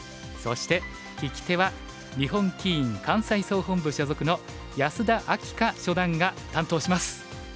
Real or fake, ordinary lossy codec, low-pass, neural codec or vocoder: real; none; none; none